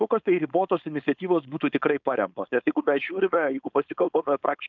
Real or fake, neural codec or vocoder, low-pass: fake; codec, 16 kHz, 4.8 kbps, FACodec; 7.2 kHz